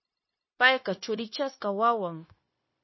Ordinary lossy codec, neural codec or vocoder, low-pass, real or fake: MP3, 24 kbps; codec, 16 kHz, 0.9 kbps, LongCat-Audio-Codec; 7.2 kHz; fake